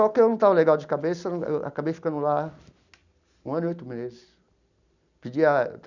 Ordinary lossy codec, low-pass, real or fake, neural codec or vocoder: none; 7.2 kHz; real; none